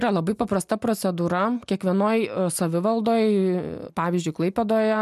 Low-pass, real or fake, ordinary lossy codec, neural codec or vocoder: 14.4 kHz; real; MP3, 96 kbps; none